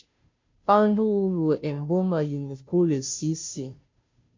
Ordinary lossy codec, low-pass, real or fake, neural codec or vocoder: MP3, 48 kbps; 7.2 kHz; fake; codec, 16 kHz, 0.5 kbps, FunCodec, trained on Chinese and English, 25 frames a second